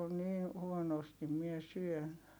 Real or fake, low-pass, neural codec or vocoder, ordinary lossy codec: real; none; none; none